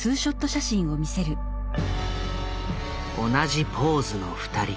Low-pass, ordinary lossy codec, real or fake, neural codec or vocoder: none; none; real; none